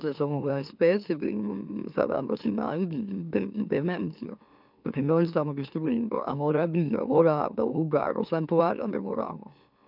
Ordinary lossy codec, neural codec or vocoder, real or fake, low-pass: none; autoencoder, 44.1 kHz, a latent of 192 numbers a frame, MeloTTS; fake; 5.4 kHz